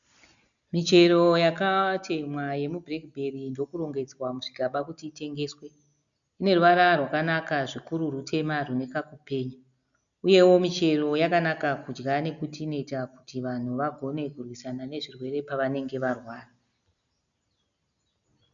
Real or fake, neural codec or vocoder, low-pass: real; none; 7.2 kHz